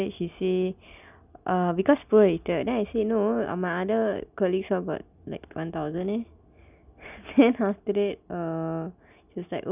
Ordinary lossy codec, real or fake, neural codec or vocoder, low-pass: none; real; none; 3.6 kHz